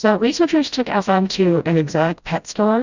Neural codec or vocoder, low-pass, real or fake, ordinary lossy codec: codec, 16 kHz, 0.5 kbps, FreqCodec, smaller model; 7.2 kHz; fake; Opus, 64 kbps